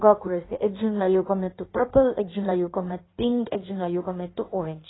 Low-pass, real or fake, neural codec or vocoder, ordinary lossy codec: 7.2 kHz; fake; codec, 16 kHz in and 24 kHz out, 1.1 kbps, FireRedTTS-2 codec; AAC, 16 kbps